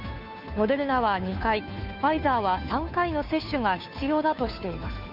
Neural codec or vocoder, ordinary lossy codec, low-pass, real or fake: codec, 16 kHz, 2 kbps, FunCodec, trained on Chinese and English, 25 frames a second; none; 5.4 kHz; fake